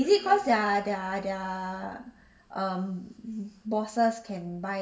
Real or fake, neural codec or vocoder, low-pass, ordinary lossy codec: real; none; none; none